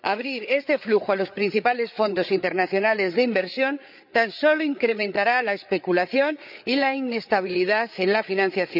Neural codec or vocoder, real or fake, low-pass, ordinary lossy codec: codec, 16 kHz, 8 kbps, FreqCodec, larger model; fake; 5.4 kHz; none